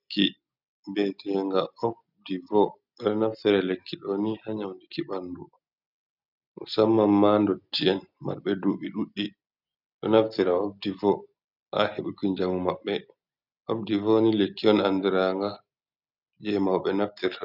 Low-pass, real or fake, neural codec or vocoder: 5.4 kHz; real; none